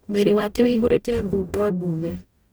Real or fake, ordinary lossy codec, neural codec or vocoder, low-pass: fake; none; codec, 44.1 kHz, 0.9 kbps, DAC; none